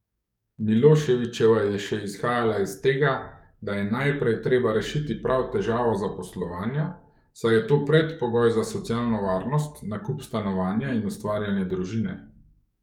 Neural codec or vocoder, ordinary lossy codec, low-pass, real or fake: codec, 44.1 kHz, 7.8 kbps, DAC; none; 19.8 kHz; fake